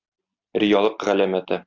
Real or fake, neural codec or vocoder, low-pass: real; none; 7.2 kHz